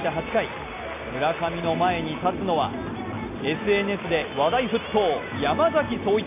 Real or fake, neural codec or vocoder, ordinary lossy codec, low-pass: real; none; AAC, 24 kbps; 3.6 kHz